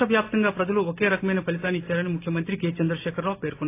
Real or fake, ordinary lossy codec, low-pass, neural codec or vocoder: real; AAC, 24 kbps; 3.6 kHz; none